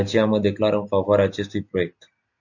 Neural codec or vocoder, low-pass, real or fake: none; 7.2 kHz; real